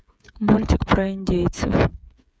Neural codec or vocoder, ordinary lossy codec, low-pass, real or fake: codec, 16 kHz, 8 kbps, FreqCodec, smaller model; none; none; fake